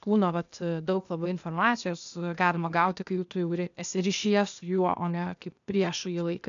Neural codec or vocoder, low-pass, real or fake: codec, 16 kHz, 0.8 kbps, ZipCodec; 7.2 kHz; fake